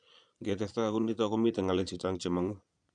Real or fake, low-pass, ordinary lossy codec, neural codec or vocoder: fake; 9.9 kHz; none; vocoder, 22.05 kHz, 80 mel bands, Vocos